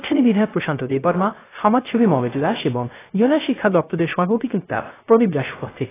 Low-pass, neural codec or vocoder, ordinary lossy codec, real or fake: 3.6 kHz; codec, 16 kHz, 0.3 kbps, FocalCodec; AAC, 16 kbps; fake